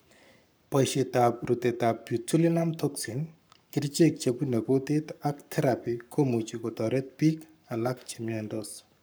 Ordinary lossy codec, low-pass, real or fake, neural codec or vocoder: none; none; fake; codec, 44.1 kHz, 7.8 kbps, Pupu-Codec